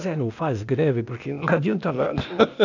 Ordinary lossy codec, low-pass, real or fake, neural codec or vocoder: none; 7.2 kHz; fake; codec, 16 kHz, 0.8 kbps, ZipCodec